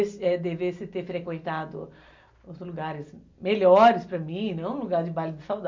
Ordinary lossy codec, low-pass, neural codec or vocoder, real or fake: MP3, 64 kbps; 7.2 kHz; none; real